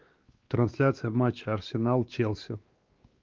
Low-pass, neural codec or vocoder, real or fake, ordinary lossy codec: 7.2 kHz; codec, 16 kHz, 2 kbps, X-Codec, WavLM features, trained on Multilingual LibriSpeech; fake; Opus, 32 kbps